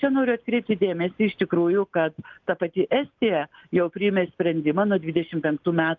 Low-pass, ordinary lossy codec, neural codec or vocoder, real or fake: 7.2 kHz; Opus, 24 kbps; none; real